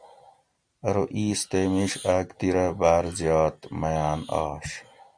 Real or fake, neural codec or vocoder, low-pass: real; none; 9.9 kHz